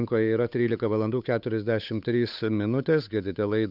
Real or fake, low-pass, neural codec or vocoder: fake; 5.4 kHz; codec, 16 kHz, 8 kbps, FunCodec, trained on Chinese and English, 25 frames a second